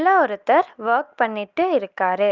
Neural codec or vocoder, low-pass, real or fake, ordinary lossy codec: none; 7.2 kHz; real; Opus, 32 kbps